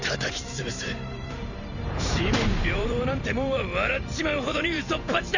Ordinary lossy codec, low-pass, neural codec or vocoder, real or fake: none; 7.2 kHz; vocoder, 44.1 kHz, 128 mel bands every 512 samples, BigVGAN v2; fake